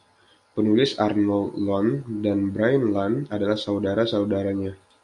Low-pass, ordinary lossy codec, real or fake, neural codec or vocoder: 10.8 kHz; AAC, 64 kbps; real; none